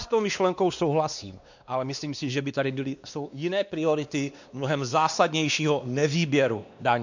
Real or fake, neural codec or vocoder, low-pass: fake; codec, 16 kHz, 2 kbps, X-Codec, WavLM features, trained on Multilingual LibriSpeech; 7.2 kHz